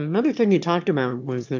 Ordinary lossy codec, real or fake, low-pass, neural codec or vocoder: MP3, 64 kbps; fake; 7.2 kHz; autoencoder, 22.05 kHz, a latent of 192 numbers a frame, VITS, trained on one speaker